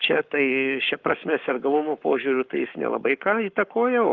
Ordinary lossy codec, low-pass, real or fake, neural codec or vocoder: Opus, 32 kbps; 7.2 kHz; fake; codec, 44.1 kHz, 7.8 kbps, Pupu-Codec